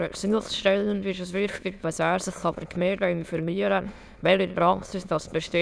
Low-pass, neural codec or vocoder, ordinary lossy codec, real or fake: none; autoencoder, 22.05 kHz, a latent of 192 numbers a frame, VITS, trained on many speakers; none; fake